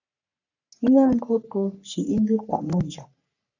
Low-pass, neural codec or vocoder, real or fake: 7.2 kHz; codec, 44.1 kHz, 3.4 kbps, Pupu-Codec; fake